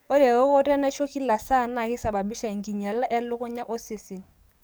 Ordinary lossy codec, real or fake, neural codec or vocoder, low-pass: none; fake; codec, 44.1 kHz, 7.8 kbps, DAC; none